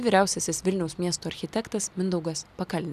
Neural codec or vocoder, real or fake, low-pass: none; real; 14.4 kHz